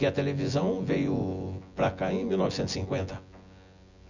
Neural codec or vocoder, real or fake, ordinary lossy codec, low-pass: vocoder, 24 kHz, 100 mel bands, Vocos; fake; none; 7.2 kHz